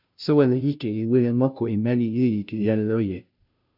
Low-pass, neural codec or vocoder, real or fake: 5.4 kHz; codec, 16 kHz, 0.5 kbps, FunCodec, trained on Chinese and English, 25 frames a second; fake